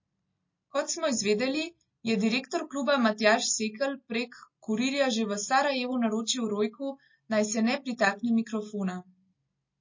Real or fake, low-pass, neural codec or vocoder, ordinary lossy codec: real; 7.2 kHz; none; MP3, 32 kbps